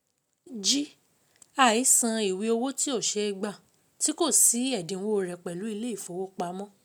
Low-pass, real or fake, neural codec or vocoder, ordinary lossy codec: none; real; none; none